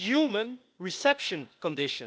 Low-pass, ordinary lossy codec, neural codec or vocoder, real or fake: none; none; codec, 16 kHz, 0.8 kbps, ZipCodec; fake